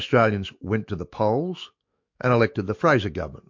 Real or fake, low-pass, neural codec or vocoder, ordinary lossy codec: fake; 7.2 kHz; autoencoder, 48 kHz, 128 numbers a frame, DAC-VAE, trained on Japanese speech; MP3, 48 kbps